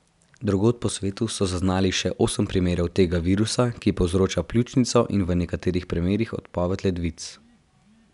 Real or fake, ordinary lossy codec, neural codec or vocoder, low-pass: real; none; none; 10.8 kHz